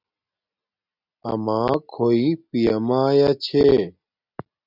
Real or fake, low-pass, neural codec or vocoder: real; 5.4 kHz; none